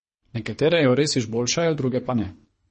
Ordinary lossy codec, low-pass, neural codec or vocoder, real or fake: MP3, 32 kbps; 10.8 kHz; codec, 24 kHz, 3 kbps, HILCodec; fake